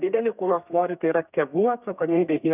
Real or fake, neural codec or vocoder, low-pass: fake; codec, 32 kHz, 1.9 kbps, SNAC; 3.6 kHz